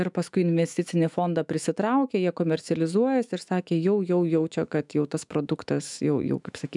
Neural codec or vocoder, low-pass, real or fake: autoencoder, 48 kHz, 128 numbers a frame, DAC-VAE, trained on Japanese speech; 10.8 kHz; fake